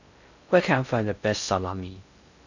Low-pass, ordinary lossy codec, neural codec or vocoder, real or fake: 7.2 kHz; none; codec, 16 kHz in and 24 kHz out, 0.6 kbps, FocalCodec, streaming, 4096 codes; fake